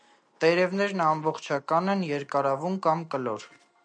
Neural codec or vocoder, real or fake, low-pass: none; real; 9.9 kHz